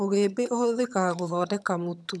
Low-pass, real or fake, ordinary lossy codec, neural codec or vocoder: none; fake; none; vocoder, 22.05 kHz, 80 mel bands, HiFi-GAN